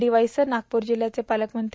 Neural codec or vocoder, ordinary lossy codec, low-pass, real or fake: none; none; none; real